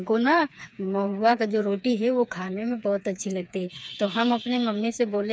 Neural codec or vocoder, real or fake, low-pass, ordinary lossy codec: codec, 16 kHz, 4 kbps, FreqCodec, smaller model; fake; none; none